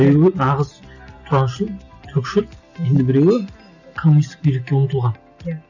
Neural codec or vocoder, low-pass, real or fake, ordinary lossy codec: none; 7.2 kHz; real; none